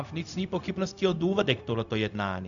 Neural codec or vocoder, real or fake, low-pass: codec, 16 kHz, 0.4 kbps, LongCat-Audio-Codec; fake; 7.2 kHz